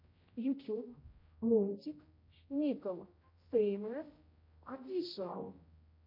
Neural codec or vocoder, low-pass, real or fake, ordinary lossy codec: codec, 16 kHz, 0.5 kbps, X-Codec, HuBERT features, trained on general audio; 5.4 kHz; fake; MP3, 32 kbps